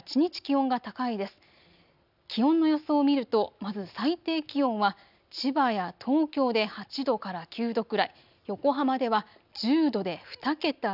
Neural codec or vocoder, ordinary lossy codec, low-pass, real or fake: none; none; 5.4 kHz; real